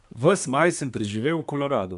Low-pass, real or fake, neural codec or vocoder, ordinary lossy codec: 10.8 kHz; fake; codec, 24 kHz, 1 kbps, SNAC; MP3, 96 kbps